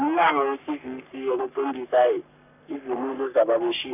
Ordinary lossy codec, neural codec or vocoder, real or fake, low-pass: none; codec, 44.1 kHz, 3.4 kbps, Pupu-Codec; fake; 3.6 kHz